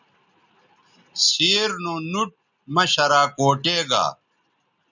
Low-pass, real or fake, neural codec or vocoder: 7.2 kHz; real; none